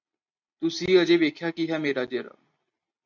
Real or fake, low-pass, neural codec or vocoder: real; 7.2 kHz; none